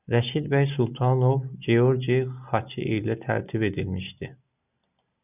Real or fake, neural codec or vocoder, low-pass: real; none; 3.6 kHz